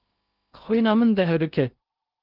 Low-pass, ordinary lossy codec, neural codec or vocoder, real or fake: 5.4 kHz; Opus, 24 kbps; codec, 16 kHz in and 24 kHz out, 0.6 kbps, FocalCodec, streaming, 2048 codes; fake